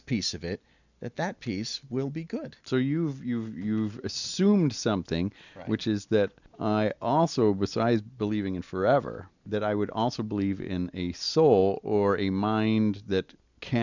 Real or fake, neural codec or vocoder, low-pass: real; none; 7.2 kHz